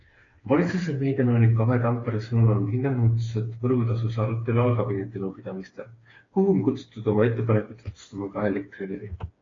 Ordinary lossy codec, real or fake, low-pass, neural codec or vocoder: AAC, 32 kbps; fake; 7.2 kHz; codec, 16 kHz, 4 kbps, FreqCodec, smaller model